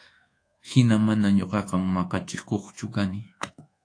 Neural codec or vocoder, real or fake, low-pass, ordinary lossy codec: codec, 24 kHz, 1.2 kbps, DualCodec; fake; 9.9 kHz; AAC, 48 kbps